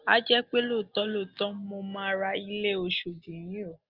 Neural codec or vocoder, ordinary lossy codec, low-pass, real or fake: none; Opus, 24 kbps; 5.4 kHz; real